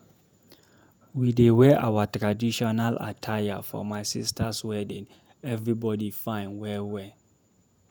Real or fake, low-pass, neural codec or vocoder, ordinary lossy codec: fake; none; vocoder, 48 kHz, 128 mel bands, Vocos; none